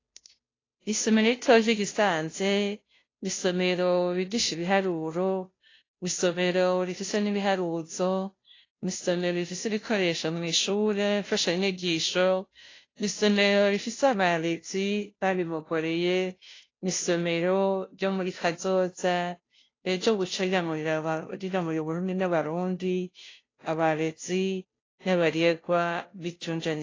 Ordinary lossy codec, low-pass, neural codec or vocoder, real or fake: AAC, 32 kbps; 7.2 kHz; codec, 16 kHz, 0.5 kbps, FunCodec, trained on Chinese and English, 25 frames a second; fake